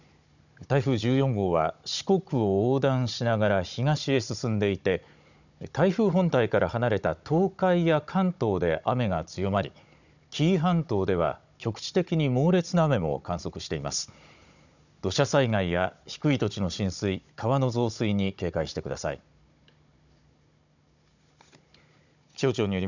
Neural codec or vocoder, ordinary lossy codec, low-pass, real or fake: codec, 16 kHz, 16 kbps, FunCodec, trained on Chinese and English, 50 frames a second; none; 7.2 kHz; fake